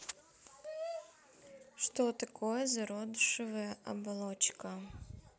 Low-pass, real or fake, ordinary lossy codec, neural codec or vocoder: none; real; none; none